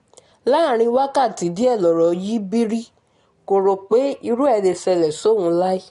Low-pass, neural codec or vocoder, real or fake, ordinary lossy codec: 10.8 kHz; none; real; AAC, 48 kbps